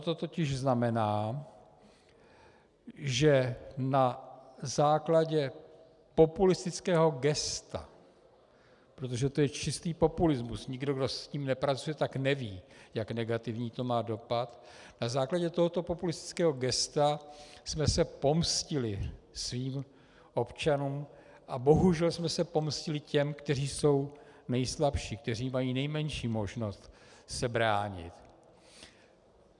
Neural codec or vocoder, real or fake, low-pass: none; real; 10.8 kHz